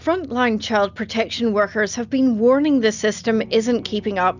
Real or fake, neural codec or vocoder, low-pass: real; none; 7.2 kHz